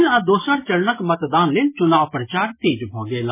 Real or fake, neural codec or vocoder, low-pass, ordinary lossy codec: real; none; 3.6 kHz; MP3, 16 kbps